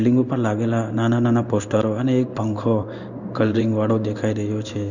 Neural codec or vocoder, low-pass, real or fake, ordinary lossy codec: codec, 16 kHz in and 24 kHz out, 1 kbps, XY-Tokenizer; 7.2 kHz; fake; Opus, 64 kbps